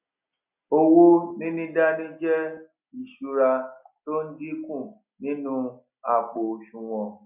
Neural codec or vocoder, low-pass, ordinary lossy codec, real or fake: none; 3.6 kHz; none; real